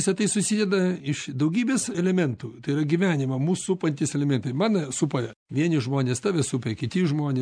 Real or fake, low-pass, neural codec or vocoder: real; 9.9 kHz; none